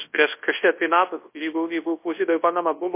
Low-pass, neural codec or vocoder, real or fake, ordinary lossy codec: 3.6 kHz; codec, 24 kHz, 0.9 kbps, WavTokenizer, large speech release; fake; MP3, 24 kbps